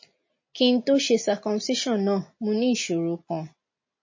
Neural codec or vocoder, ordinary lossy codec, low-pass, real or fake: none; MP3, 32 kbps; 7.2 kHz; real